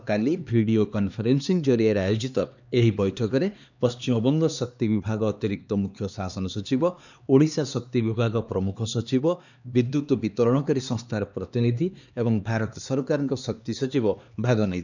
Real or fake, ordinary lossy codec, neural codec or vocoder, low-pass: fake; none; codec, 16 kHz, 2 kbps, X-Codec, HuBERT features, trained on LibriSpeech; 7.2 kHz